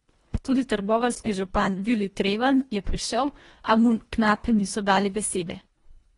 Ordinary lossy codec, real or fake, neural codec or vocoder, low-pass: AAC, 32 kbps; fake; codec, 24 kHz, 1.5 kbps, HILCodec; 10.8 kHz